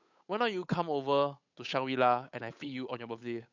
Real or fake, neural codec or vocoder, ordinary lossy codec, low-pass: fake; codec, 16 kHz, 8 kbps, FunCodec, trained on Chinese and English, 25 frames a second; none; 7.2 kHz